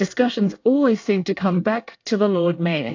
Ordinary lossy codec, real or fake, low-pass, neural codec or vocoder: AAC, 48 kbps; fake; 7.2 kHz; codec, 24 kHz, 1 kbps, SNAC